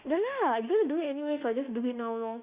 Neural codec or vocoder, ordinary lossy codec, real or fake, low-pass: autoencoder, 48 kHz, 32 numbers a frame, DAC-VAE, trained on Japanese speech; Opus, 64 kbps; fake; 3.6 kHz